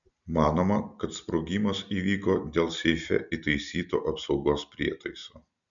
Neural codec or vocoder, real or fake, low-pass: none; real; 7.2 kHz